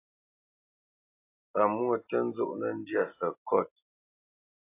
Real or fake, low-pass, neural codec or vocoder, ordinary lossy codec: real; 3.6 kHz; none; AAC, 24 kbps